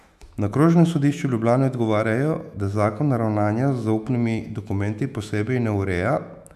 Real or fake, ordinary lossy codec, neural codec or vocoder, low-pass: fake; none; autoencoder, 48 kHz, 128 numbers a frame, DAC-VAE, trained on Japanese speech; 14.4 kHz